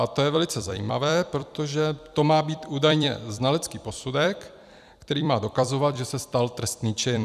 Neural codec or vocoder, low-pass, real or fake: vocoder, 44.1 kHz, 128 mel bands every 256 samples, BigVGAN v2; 14.4 kHz; fake